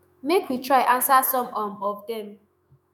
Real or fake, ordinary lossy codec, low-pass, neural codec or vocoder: fake; none; none; autoencoder, 48 kHz, 128 numbers a frame, DAC-VAE, trained on Japanese speech